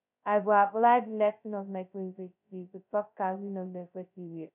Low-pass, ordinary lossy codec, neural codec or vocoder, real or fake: 3.6 kHz; none; codec, 16 kHz, 0.2 kbps, FocalCodec; fake